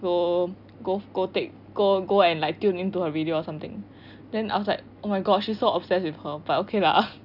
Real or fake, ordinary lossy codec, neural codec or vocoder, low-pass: real; none; none; 5.4 kHz